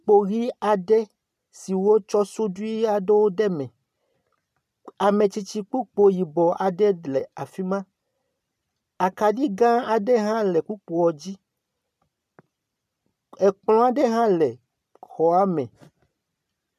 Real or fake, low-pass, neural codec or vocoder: real; 14.4 kHz; none